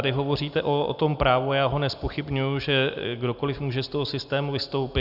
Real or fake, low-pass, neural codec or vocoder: real; 5.4 kHz; none